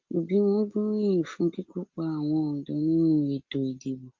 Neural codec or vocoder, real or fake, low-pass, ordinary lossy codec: none; real; 7.2 kHz; Opus, 32 kbps